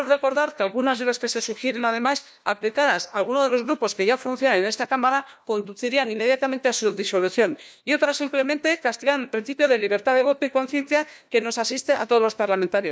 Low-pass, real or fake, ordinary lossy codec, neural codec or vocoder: none; fake; none; codec, 16 kHz, 1 kbps, FunCodec, trained on LibriTTS, 50 frames a second